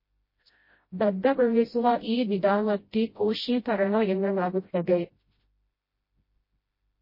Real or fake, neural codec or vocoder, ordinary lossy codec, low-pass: fake; codec, 16 kHz, 0.5 kbps, FreqCodec, smaller model; MP3, 24 kbps; 5.4 kHz